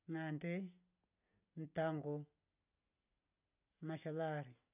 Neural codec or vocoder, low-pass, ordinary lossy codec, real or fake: none; 3.6 kHz; none; real